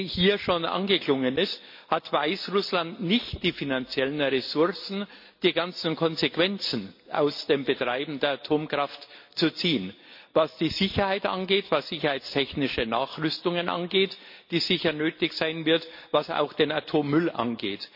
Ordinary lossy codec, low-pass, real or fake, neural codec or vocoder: MP3, 32 kbps; 5.4 kHz; real; none